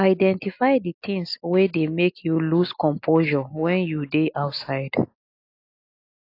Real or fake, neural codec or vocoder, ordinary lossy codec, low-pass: real; none; AAC, 32 kbps; 5.4 kHz